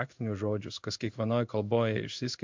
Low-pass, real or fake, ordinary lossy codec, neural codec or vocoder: 7.2 kHz; fake; MP3, 64 kbps; codec, 16 kHz in and 24 kHz out, 1 kbps, XY-Tokenizer